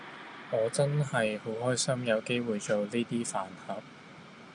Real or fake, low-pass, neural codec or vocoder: real; 9.9 kHz; none